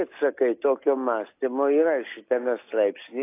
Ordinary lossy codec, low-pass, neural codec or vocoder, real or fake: AAC, 24 kbps; 3.6 kHz; none; real